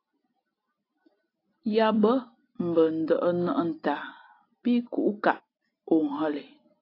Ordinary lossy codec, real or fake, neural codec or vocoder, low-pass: AAC, 32 kbps; real; none; 5.4 kHz